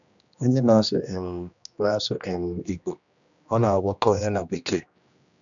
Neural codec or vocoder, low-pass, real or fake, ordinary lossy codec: codec, 16 kHz, 1 kbps, X-Codec, HuBERT features, trained on general audio; 7.2 kHz; fake; none